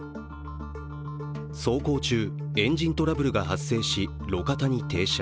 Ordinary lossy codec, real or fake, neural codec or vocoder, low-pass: none; real; none; none